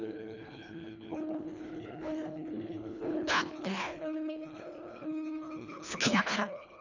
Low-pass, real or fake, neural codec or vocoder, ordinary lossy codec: 7.2 kHz; fake; codec, 24 kHz, 1.5 kbps, HILCodec; none